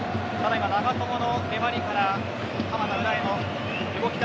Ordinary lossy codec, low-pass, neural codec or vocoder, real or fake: none; none; none; real